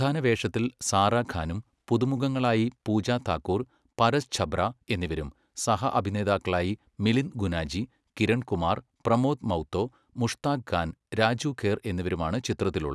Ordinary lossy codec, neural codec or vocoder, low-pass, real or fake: none; none; none; real